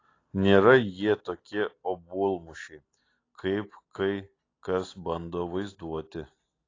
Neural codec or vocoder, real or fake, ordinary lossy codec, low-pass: none; real; AAC, 32 kbps; 7.2 kHz